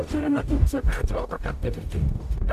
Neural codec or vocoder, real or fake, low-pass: codec, 44.1 kHz, 0.9 kbps, DAC; fake; 14.4 kHz